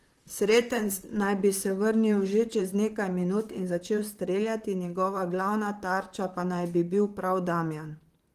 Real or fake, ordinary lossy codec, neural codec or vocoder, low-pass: fake; Opus, 24 kbps; vocoder, 44.1 kHz, 128 mel bands, Pupu-Vocoder; 14.4 kHz